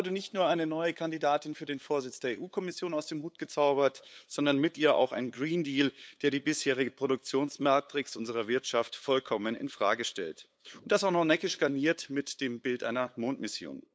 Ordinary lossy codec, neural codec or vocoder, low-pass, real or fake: none; codec, 16 kHz, 8 kbps, FunCodec, trained on LibriTTS, 25 frames a second; none; fake